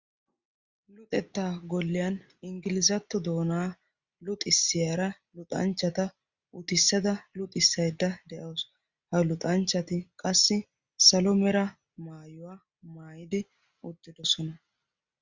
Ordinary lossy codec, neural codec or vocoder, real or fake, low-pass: Opus, 64 kbps; none; real; 7.2 kHz